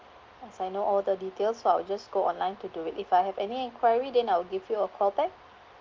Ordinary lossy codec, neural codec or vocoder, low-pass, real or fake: Opus, 32 kbps; none; 7.2 kHz; real